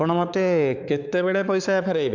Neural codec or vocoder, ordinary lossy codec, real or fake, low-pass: codec, 16 kHz, 4 kbps, X-Codec, HuBERT features, trained on balanced general audio; none; fake; 7.2 kHz